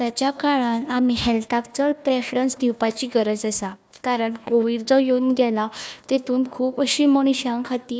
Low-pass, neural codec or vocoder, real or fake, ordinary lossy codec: none; codec, 16 kHz, 1 kbps, FunCodec, trained on Chinese and English, 50 frames a second; fake; none